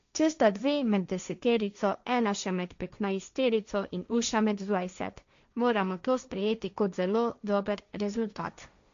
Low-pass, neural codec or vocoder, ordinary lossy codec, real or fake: 7.2 kHz; codec, 16 kHz, 1.1 kbps, Voila-Tokenizer; MP3, 64 kbps; fake